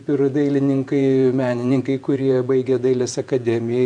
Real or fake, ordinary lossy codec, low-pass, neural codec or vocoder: fake; MP3, 64 kbps; 9.9 kHz; vocoder, 48 kHz, 128 mel bands, Vocos